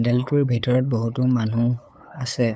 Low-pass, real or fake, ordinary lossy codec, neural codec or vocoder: none; fake; none; codec, 16 kHz, 8 kbps, FunCodec, trained on LibriTTS, 25 frames a second